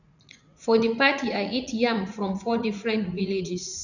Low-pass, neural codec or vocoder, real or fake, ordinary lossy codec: 7.2 kHz; vocoder, 44.1 kHz, 80 mel bands, Vocos; fake; none